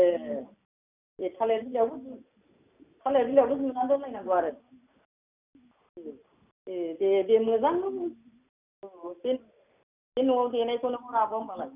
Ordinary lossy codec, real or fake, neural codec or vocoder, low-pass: none; real; none; 3.6 kHz